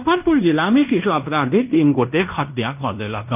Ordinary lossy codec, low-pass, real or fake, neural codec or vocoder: none; 3.6 kHz; fake; codec, 16 kHz in and 24 kHz out, 0.9 kbps, LongCat-Audio-Codec, fine tuned four codebook decoder